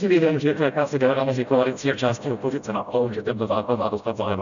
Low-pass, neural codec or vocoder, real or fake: 7.2 kHz; codec, 16 kHz, 0.5 kbps, FreqCodec, smaller model; fake